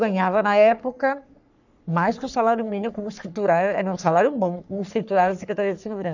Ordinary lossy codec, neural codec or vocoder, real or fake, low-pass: none; codec, 44.1 kHz, 3.4 kbps, Pupu-Codec; fake; 7.2 kHz